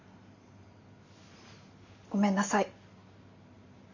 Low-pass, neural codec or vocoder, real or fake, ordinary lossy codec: 7.2 kHz; none; real; none